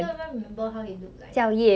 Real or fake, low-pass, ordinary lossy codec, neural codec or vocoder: real; none; none; none